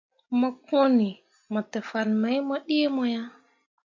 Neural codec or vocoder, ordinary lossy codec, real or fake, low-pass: none; MP3, 48 kbps; real; 7.2 kHz